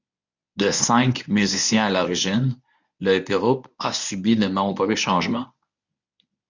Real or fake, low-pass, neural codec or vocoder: fake; 7.2 kHz; codec, 24 kHz, 0.9 kbps, WavTokenizer, medium speech release version 1